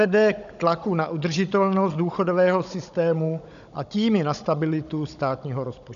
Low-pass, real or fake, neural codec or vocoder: 7.2 kHz; fake; codec, 16 kHz, 16 kbps, FunCodec, trained on Chinese and English, 50 frames a second